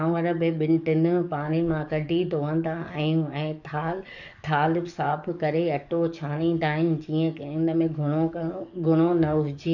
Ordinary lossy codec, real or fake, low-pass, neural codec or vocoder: none; fake; 7.2 kHz; codec, 44.1 kHz, 7.8 kbps, DAC